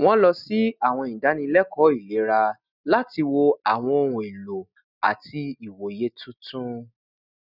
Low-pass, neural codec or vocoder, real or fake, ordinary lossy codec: 5.4 kHz; none; real; none